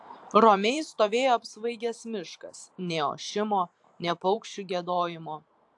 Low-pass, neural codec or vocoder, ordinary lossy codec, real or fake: 10.8 kHz; vocoder, 44.1 kHz, 128 mel bands every 512 samples, BigVGAN v2; AAC, 64 kbps; fake